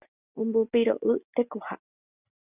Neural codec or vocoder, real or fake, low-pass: none; real; 3.6 kHz